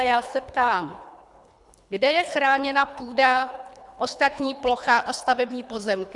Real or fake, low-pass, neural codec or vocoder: fake; 10.8 kHz; codec, 24 kHz, 3 kbps, HILCodec